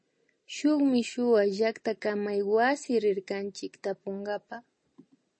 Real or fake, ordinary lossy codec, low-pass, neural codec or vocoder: real; MP3, 32 kbps; 9.9 kHz; none